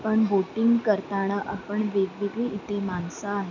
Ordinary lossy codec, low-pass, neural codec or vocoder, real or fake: none; 7.2 kHz; none; real